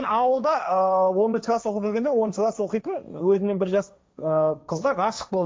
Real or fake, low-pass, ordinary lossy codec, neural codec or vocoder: fake; none; none; codec, 16 kHz, 1.1 kbps, Voila-Tokenizer